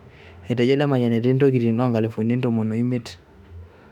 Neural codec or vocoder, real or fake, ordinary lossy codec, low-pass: autoencoder, 48 kHz, 32 numbers a frame, DAC-VAE, trained on Japanese speech; fake; none; 19.8 kHz